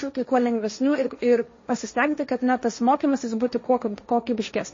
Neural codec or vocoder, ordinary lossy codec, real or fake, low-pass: codec, 16 kHz, 1.1 kbps, Voila-Tokenizer; MP3, 32 kbps; fake; 7.2 kHz